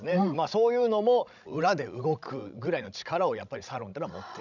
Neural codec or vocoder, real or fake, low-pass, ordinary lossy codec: codec, 16 kHz, 16 kbps, FreqCodec, larger model; fake; 7.2 kHz; none